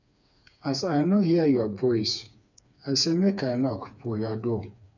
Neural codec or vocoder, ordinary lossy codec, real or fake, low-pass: codec, 16 kHz, 4 kbps, FreqCodec, smaller model; none; fake; 7.2 kHz